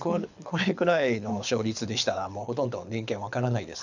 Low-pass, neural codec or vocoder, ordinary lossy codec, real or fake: 7.2 kHz; codec, 24 kHz, 6 kbps, HILCodec; none; fake